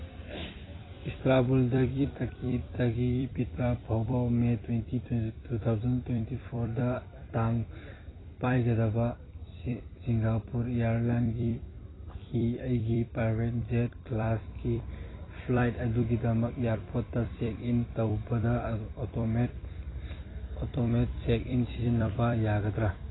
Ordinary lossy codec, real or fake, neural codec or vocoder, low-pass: AAC, 16 kbps; fake; vocoder, 44.1 kHz, 128 mel bands every 256 samples, BigVGAN v2; 7.2 kHz